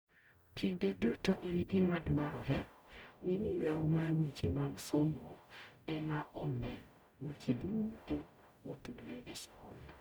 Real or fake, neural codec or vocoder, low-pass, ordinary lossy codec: fake; codec, 44.1 kHz, 0.9 kbps, DAC; none; none